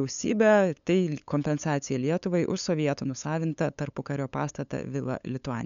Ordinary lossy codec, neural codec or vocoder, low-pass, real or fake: MP3, 96 kbps; codec, 16 kHz, 8 kbps, FunCodec, trained on LibriTTS, 25 frames a second; 7.2 kHz; fake